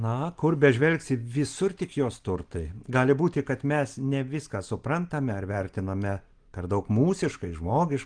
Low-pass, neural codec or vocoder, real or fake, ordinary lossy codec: 9.9 kHz; none; real; Opus, 24 kbps